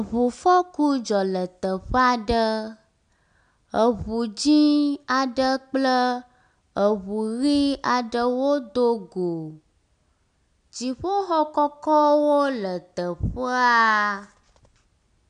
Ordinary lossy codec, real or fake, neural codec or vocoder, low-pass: MP3, 96 kbps; real; none; 9.9 kHz